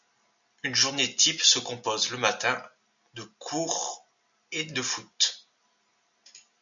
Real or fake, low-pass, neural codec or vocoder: real; 7.2 kHz; none